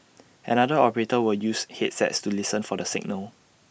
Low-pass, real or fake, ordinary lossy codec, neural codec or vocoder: none; real; none; none